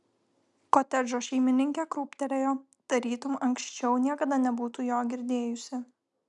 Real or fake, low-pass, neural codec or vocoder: real; 10.8 kHz; none